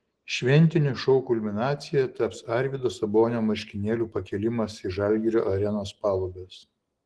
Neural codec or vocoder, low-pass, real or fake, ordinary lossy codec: none; 10.8 kHz; real; Opus, 16 kbps